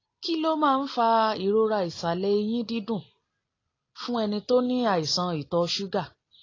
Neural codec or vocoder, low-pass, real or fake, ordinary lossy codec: none; 7.2 kHz; real; AAC, 32 kbps